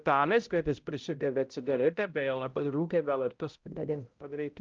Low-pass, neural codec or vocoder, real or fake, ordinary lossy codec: 7.2 kHz; codec, 16 kHz, 0.5 kbps, X-Codec, HuBERT features, trained on balanced general audio; fake; Opus, 24 kbps